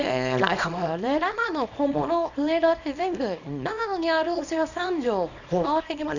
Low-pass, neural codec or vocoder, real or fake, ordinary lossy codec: 7.2 kHz; codec, 24 kHz, 0.9 kbps, WavTokenizer, small release; fake; none